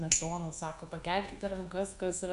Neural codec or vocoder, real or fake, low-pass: codec, 24 kHz, 1.2 kbps, DualCodec; fake; 10.8 kHz